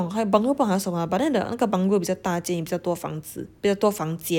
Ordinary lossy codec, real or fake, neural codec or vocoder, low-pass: none; real; none; 19.8 kHz